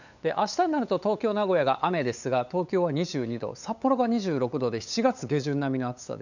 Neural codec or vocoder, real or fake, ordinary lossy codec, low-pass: codec, 16 kHz, 8 kbps, FunCodec, trained on LibriTTS, 25 frames a second; fake; none; 7.2 kHz